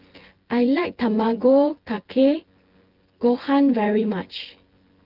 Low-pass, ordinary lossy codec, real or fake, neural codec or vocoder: 5.4 kHz; Opus, 16 kbps; fake; vocoder, 24 kHz, 100 mel bands, Vocos